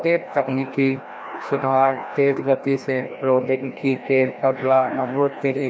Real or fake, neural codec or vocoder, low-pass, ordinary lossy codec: fake; codec, 16 kHz, 1 kbps, FreqCodec, larger model; none; none